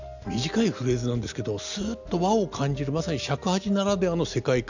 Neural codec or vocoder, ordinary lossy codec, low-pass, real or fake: none; none; 7.2 kHz; real